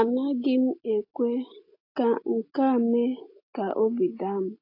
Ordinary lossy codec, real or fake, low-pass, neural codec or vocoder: AAC, 32 kbps; real; 5.4 kHz; none